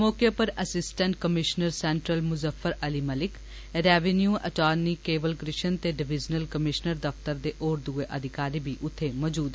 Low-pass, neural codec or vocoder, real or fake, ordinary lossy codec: none; none; real; none